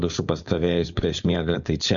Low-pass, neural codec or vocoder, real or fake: 7.2 kHz; codec, 16 kHz, 4.8 kbps, FACodec; fake